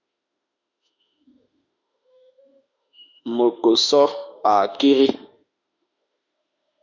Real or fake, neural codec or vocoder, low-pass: fake; autoencoder, 48 kHz, 32 numbers a frame, DAC-VAE, trained on Japanese speech; 7.2 kHz